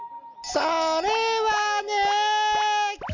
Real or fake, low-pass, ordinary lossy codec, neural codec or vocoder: real; 7.2 kHz; none; none